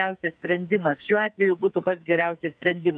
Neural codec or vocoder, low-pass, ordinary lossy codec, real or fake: codec, 44.1 kHz, 2.6 kbps, SNAC; 9.9 kHz; MP3, 96 kbps; fake